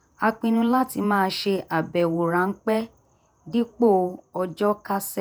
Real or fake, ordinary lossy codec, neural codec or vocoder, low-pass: fake; none; vocoder, 48 kHz, 128 mel bands, Vocos; none